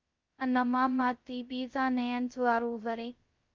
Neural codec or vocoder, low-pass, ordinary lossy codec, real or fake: codec, 16 kHz, 0.2 kbps, FocalCodec; 7.2 kHz; Opus, 24 kbps; fake